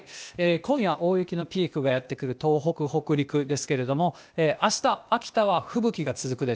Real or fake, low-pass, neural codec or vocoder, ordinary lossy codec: fake; none; codec, 16 kHz, 0.8 kbps, ZipCodec; none